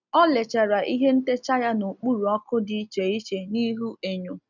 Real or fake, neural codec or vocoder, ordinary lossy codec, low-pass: real; none; none; 7.2 kHz